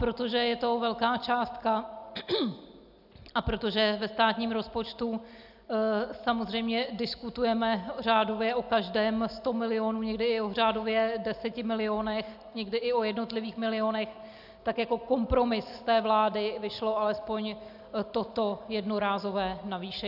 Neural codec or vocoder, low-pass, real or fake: none; 5.4 kHz; real